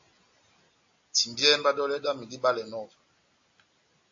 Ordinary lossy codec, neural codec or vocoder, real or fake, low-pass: AAC, 48 kbps; none; real; 7.2 kHz